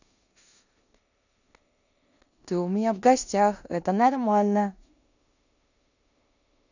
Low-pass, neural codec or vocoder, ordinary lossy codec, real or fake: 7.2 kHz; codec, 16 kHz in and 24 kHz out, 0.9 kbps, LongCat-Audio-Codec, four codebook decoder; none; fake